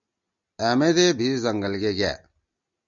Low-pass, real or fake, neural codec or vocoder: 7.2 kHz; real; none